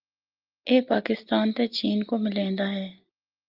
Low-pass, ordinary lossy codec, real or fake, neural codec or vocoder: 5.4 kHz; Opus, 32 kbps; real; none